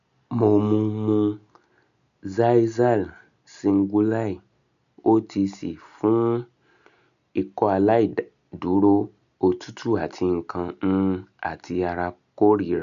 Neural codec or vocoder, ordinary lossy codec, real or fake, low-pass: none; none; real; 7.2 kHz